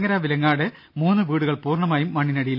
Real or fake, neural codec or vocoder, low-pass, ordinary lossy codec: real; none; 5.4 kHz; none